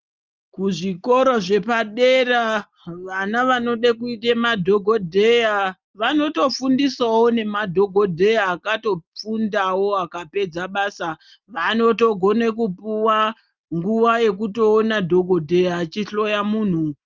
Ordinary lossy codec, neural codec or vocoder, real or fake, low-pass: Opus, 24 kbps; none; real; 7.2 kHz